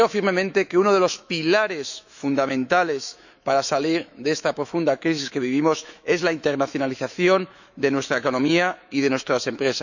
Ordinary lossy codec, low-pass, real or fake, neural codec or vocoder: none; 7.2 kHz; fake; autoencoder, 48 kHz, 128 numbers a frame, DAC-VAE, trained on Japanese speech